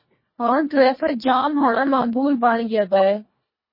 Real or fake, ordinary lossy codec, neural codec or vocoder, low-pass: fake; MP3, 24 kbps; codec, 24 kHz, 1.5 kbps, HILCodec; 5.4 kHz